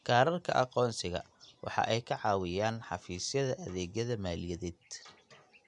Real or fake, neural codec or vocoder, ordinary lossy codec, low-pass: real; none; none; 10.8 kHz